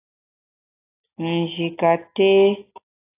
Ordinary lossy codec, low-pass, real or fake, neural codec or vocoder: AAC, 32 kbps; 3.6 kHz; real; none